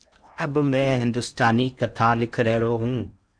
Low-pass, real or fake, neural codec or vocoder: 9.9 kHz; fake; codec, 16 kHz in and 24 kHz out, 0.8 kbps, FocalCodec, streaming, 65536 codes